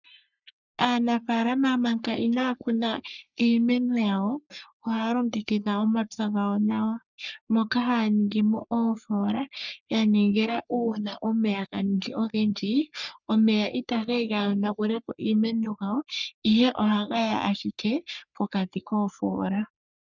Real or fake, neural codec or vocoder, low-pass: fake; codec, 44.1 kHz, 3.4 kbps, Pupu-Codec; 7.2 kHz